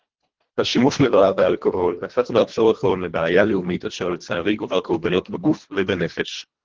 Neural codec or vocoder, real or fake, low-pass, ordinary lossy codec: codec, 24 kHz, 1.5 kbps, HILCodec; fake; 7.2 kHz; Opus, 16 kbps